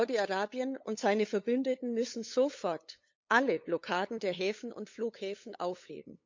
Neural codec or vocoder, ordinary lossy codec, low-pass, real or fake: codec, 16 kHz, 8 kbps, FunCodec, trained on LibriTTS, 25 frames a second; MP3, 64 kbps; 7.2 kHz; fake